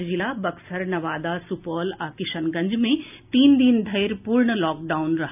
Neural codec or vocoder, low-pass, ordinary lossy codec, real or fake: none; 3.6 kHz; none; real